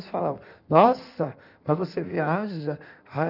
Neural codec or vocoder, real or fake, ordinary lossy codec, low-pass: codec, 16 kHz in and 24 kHz out, 1.1 kbps, FireRedTTS-2 codec; fake; none; 5.4 kHz